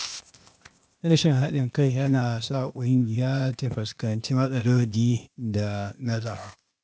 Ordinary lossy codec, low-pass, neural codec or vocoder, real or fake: none; none; codec, 16 kHz, 0.8 kbps, ZipCodec; fake